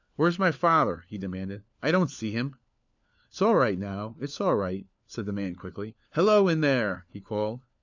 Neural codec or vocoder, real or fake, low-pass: codec, 16 kHz, 4 kbps, FunCodec, trained on LibriTTS, 50 frames a second; fake; 7.2 kHz